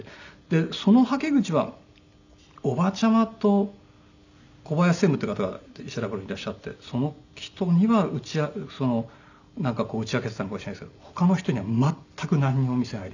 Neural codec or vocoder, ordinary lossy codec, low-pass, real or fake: none; none; 7.2 kHz; real